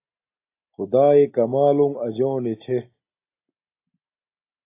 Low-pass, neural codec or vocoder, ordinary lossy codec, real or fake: 3.6 kHz; none; AAC, 24 kbps; real